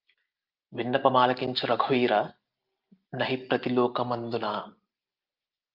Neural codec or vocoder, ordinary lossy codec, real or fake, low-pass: none; Opus, 24 kbps; real; 5.4 kHz